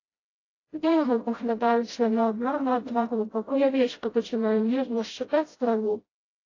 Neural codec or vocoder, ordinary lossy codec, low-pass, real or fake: codec, 16 kHz, 0.5 kbps, FreqCodec, smaller model; AAC, 32 kbps; 7.2 kHz; fake